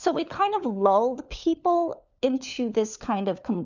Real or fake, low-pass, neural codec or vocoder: fake; 7.2 kHz; codec, 16 kHz, 4 kbps, FunCodec, trained on LibriTTS, 50 frames a second